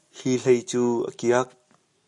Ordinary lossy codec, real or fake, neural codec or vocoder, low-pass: MP3, 64 kbps; real; none; 10.8 kHz